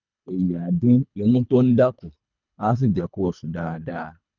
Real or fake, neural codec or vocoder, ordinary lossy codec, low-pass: fake; codec, 24 kHz, 3 kbps, HILCodec; none; 7.2 kHz